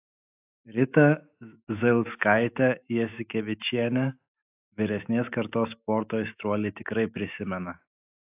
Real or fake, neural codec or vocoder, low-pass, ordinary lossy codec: fake; autoencoder, 48 kHz, 128 numbers a frame, DAC-VAE, trained on Japanese speech; 3.6 kHz; AAC, 32 kbps